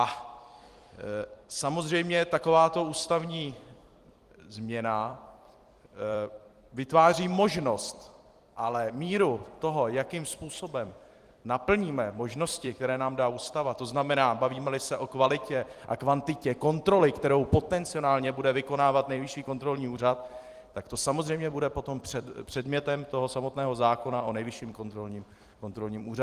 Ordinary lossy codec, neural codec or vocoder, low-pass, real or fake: Opus, 24 kbps; vocoder, 44.1 kHz, 128 mel bands every 256 samples, BigVGAN v2; 14.4 kHz; fake